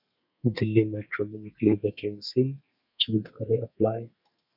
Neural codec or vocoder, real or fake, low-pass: codec, 32 kHz, 1.9 kbps, SNAC; fake; 5.4 kHz